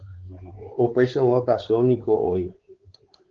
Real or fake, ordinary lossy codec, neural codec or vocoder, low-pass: fake; Opus, 16 kbps; codec, 16 kHz, 4 kbps, X-Codec, WavLM features, trained on Multilingual LibriSpeech; 7.2 kHz